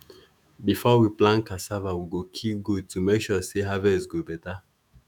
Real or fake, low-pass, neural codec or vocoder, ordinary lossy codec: fake; 19.8 kHz; autoencoder, 48 kHz, 128 numbers a frame, DAC-VAE, trained on Japanese speech; none